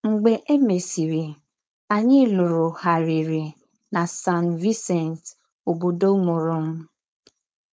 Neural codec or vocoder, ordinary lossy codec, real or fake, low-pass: codec, 16 kHz, 4.8 kbps, FACodec; none; fake; none